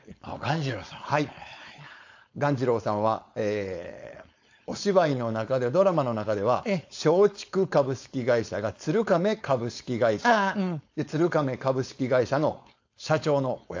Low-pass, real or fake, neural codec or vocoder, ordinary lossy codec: 7.2 kHz; fake; codec, 16 kHz, 4.8 kbps, FACodec; AAC, 48 kbps